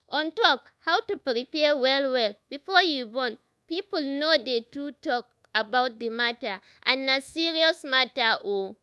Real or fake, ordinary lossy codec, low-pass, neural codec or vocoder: fake; none; none; codec, 24 kHz, 1.2 kbps, DualCodec